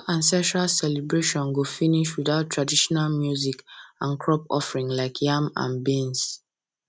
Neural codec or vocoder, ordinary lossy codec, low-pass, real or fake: none; none; none; real